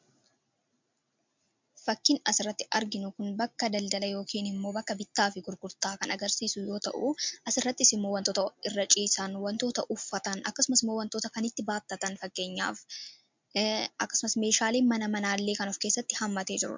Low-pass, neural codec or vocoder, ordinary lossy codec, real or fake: 7.2 kHz; none; MP3, 64 kbps; real